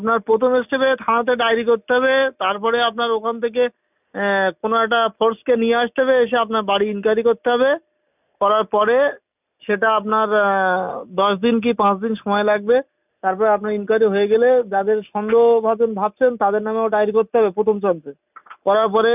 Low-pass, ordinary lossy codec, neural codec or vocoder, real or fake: 3.6 kHz; none; none; real